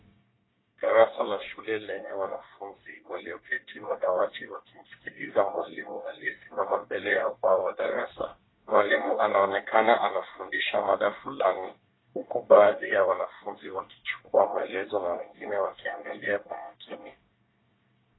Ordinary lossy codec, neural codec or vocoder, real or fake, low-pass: AAC, 16 kbps; codec, 24 kHz, 1 kbps, SNAC; fake; 7.2 kHz